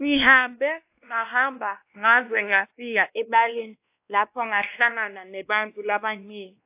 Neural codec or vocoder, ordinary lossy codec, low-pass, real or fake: codec, 16 kHz, 1 kbps, X-Codec, WavLM features, trained on Multilingual LibriSpeech; AAC, 32 kbps; 3.6 kHz; fake